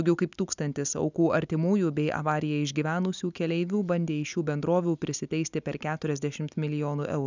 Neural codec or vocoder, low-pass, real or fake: none; 7.2 kHz; real